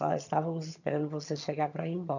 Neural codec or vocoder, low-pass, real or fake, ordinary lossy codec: vocoder, 22.05 kHz, 80 mel bands, HiFi-GAN; 7.2 kHz; fake; none